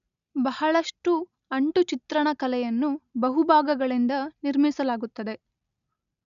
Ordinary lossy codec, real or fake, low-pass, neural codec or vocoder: none; real; 7.2 kHz; none